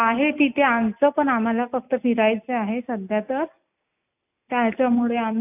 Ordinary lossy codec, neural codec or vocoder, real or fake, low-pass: AAC, 32 kbps; none; real; 3.6 kHz